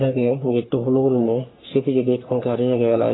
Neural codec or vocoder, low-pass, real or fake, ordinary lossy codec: codec, 44.1 kHz, 3.4 kbps, Pupu-Codec; 7.2 kHz; fake; AAC, 16 kbps